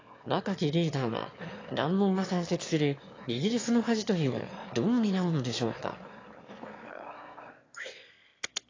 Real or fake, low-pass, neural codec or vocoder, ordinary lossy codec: fake; 7.2 kHz; autoencoder, 22.05 kHz, a latent of 192 numbers a frame, VITS, trained on one speaker; AAC, 32 kbps